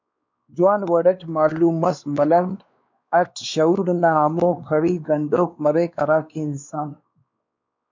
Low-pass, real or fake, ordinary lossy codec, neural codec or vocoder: 7.2 kHz; fake; MP3, 64 kbps; codec, 16 kHz, 2 kbps, X-Codec, WavLM features, trained on Multilingual LibriSpeech